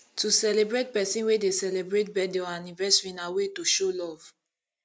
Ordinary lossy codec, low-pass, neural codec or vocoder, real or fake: none; none; none; real